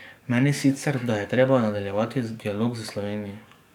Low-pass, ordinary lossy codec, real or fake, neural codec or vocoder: 19.8 kHz; none; fake; codec, 44.1 kHz, 7.8 kbps, DAC